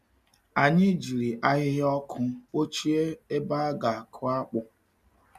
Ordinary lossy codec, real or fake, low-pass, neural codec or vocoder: MP3, 96 kbps; real; 14.4 kHz; none